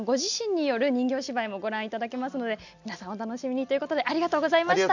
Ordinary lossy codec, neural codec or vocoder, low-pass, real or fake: none; none; 7.2 kHz; real